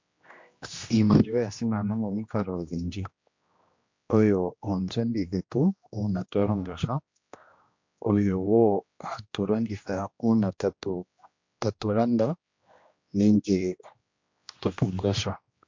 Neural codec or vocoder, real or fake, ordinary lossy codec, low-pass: codec, 16 kHz, 1 kbps, X-Codec, HuBERT features, trained on general audio; fake; MP3, 48 kbps; 7.2 kHz